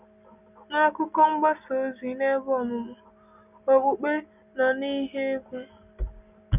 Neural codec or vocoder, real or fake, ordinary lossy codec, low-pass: none; real; none; 3.6 kHz